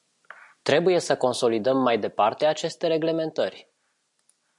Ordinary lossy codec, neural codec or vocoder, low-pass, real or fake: MP3, 96 kbps; none; 10.8 kHz; real